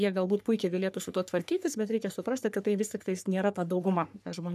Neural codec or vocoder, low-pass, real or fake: codec, 44.1 kHz, 3.4 kbps, Pupu-Codec; 14.4 kHz; fake